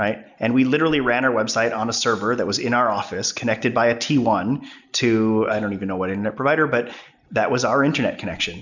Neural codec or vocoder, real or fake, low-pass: none; real; 7.2 kHz